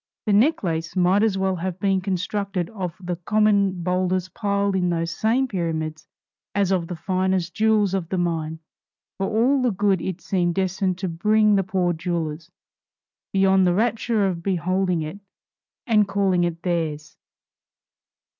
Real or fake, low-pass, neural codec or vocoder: real; 7.2 kHz; none